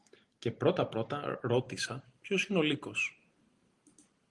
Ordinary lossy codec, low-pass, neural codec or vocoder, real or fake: Opus, 24 kbps; 10.8 kHz; none; real